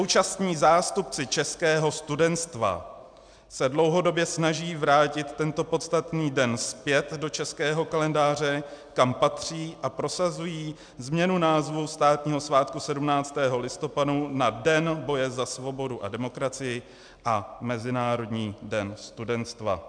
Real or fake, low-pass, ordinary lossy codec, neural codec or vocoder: real; 9.9 kHz; MP3, 96 kbps; none